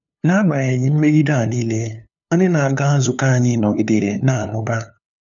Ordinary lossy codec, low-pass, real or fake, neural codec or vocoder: none; 7.2 kHz; fake; codec, 16 kHz, 2 kbps, FunCodec, trained on LibriTTS, 25 frames a second